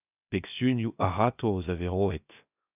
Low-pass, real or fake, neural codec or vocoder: 3.6 kHz; fake; codec, 16 kHz, 0.7 kbps, FocalCodec